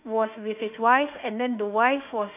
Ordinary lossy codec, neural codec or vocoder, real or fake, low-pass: none; autoencoder, 48 kHz, 32 numbers a frame, DAC-VAE, trained on Japanese speech; fake; 3.6 kHz